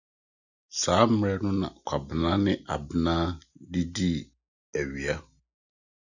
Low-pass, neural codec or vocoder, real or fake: 7.2 kHz; none; real